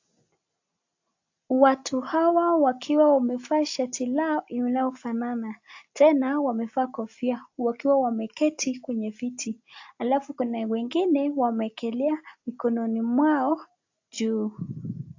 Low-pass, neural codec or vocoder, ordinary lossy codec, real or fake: 7.2 kHz; none; AAC, 48 kbps; real